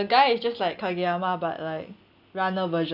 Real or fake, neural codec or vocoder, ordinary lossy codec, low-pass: real; none; none; 5.4 kHz